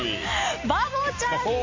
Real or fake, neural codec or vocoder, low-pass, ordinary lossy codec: real; none; 7.2 kHz; AAC, 48 kbps